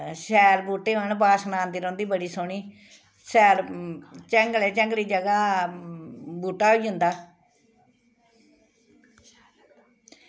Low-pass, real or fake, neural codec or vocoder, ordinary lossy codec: none; real; none; none